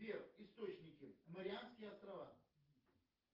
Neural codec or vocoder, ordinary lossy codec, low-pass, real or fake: none; Opus, 32 kbps; 5.4 kHz; real